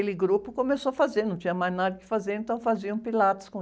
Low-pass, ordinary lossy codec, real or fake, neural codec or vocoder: none; none; real; none